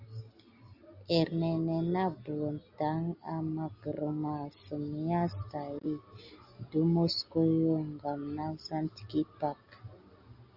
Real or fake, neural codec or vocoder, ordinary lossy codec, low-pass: real; none; Opus, 64 kbps; 5.4 kHz